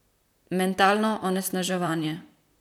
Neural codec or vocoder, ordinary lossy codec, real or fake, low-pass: vocoder, 44.1 kHz, 128 mel bands, Pupu-Vocoder; none; fake; 19.8 kHz